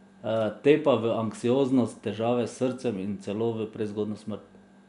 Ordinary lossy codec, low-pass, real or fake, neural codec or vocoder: none; 10.8 kHz; real; none